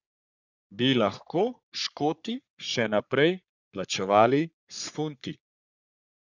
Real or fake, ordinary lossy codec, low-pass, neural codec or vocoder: fake; none; 7.2 kHz; codec, 44.1 kHz, 3.4 kbps, Pupu-Codec